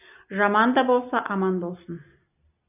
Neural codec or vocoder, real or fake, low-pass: none; real; 3.6 kHz